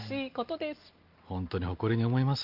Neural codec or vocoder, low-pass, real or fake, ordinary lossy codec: none; 5.4 kHz; real; Opus, 32 kbps